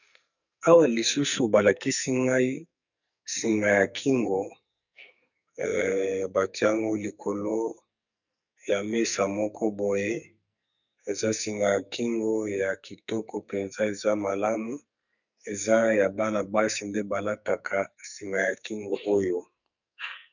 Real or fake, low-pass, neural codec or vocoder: fake; 7.2 kHz; codec, 44.1 kHz, 2.6 kbps, SNAC